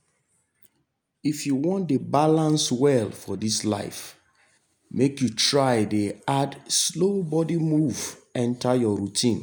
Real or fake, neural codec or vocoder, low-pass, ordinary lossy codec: real; none; none; none